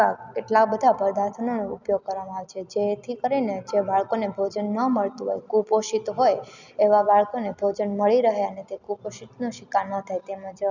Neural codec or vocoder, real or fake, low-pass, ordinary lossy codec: none; real; 7.2 kHz; none